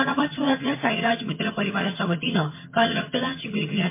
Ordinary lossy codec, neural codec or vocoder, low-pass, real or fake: MP3, 24 kbps; vocoder, 22.05 kHz, 80 mel bands, HiFi-GAN; 3.6 kHz; fake